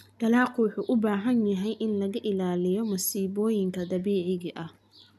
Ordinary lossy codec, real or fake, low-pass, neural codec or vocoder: none; real; 14.4 kHz; none